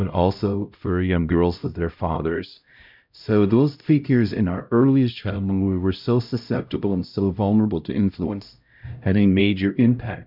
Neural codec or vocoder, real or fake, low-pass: codec, 16 kHz, 0.5 kbps, X-Codec, HuBERT features, trained on LibriSpeech; fake; 5.4 kHz